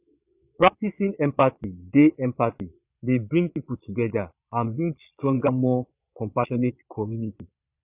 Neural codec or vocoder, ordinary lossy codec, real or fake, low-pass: vocoder, 22.05 kHz, 80 mel bands, Vocos; none; fake; 3.6 kHz